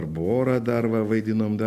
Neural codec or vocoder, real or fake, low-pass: none; real; 14.4 kHz